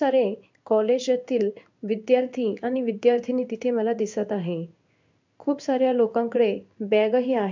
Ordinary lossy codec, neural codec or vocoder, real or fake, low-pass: MP3, 64 kbps; codec, 16 kHz in and 24 kHz out, 1 kbps, XY-Tokenizer; fake; 7.2 kHz